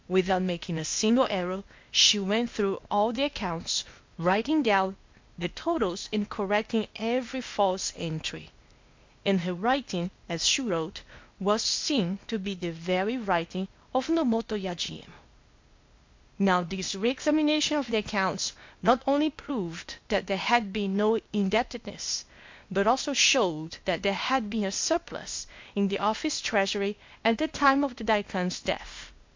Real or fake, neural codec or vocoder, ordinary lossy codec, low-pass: fake; codec, 16 kHz, 0.8 kbps, ZipCodec; MP3, 48 kbps; 7.2 kHz